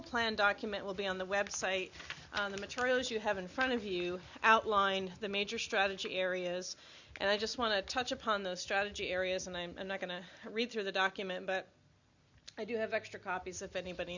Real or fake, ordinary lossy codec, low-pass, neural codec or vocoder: real; Opus, 64 kbps; 7.2 kHz; none